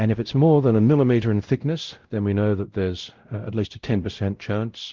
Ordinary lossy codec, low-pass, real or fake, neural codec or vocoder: Opus, 16 kbps; 7.2 kHz; fake; codec, 16 kHz, 0.5 kbps, X-Codec, WavLM features, trained on Multilingual LibriSpeech